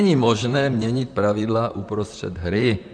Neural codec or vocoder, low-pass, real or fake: vocoder, 44.1 kHz, 128 mel bands, Pupu-Vocoder; 9.9 kHz; fake